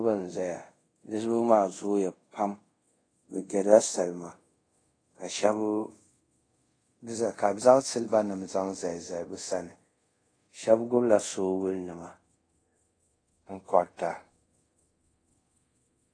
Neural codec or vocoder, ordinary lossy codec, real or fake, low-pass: codec, 24 kHz, 0.5 kbps, DualCodec; AAC, 32 kbps; fake; 9.9 kHz